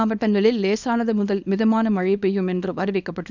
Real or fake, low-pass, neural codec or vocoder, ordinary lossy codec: fake; 7.2 kHz; codec, 24 kHz, 0.9 kbps, WavTokenizer, small release; none